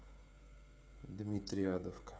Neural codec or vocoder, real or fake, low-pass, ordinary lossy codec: codec, 16 kHz, 16 kbps, FreqCodec, smaller model; fake; none; none